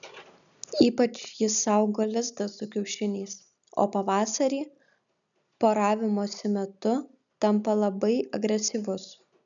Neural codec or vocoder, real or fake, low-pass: none; real; 7.2 kHz